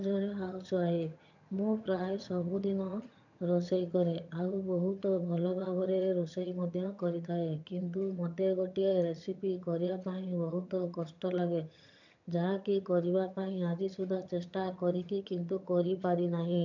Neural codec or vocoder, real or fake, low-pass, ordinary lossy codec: vocoder, 22.05 kHz, 80 mel bands, HiFi-GAN; fake; 7.2 kHz; none